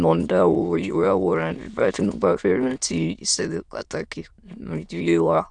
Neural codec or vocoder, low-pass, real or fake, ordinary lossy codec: autoencoder, 22.05 kHz, a latent of 192 numbers a frame, VITS, trained on many speakers; 9.9 kHz; fake; none